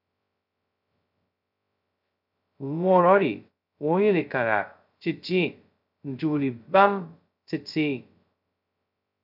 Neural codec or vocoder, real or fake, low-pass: codec, 16 kHz, 0.2 kbps, FocalCodec; fake; 5.4 kHz